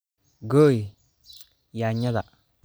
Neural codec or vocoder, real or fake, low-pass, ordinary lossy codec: none; real; none; none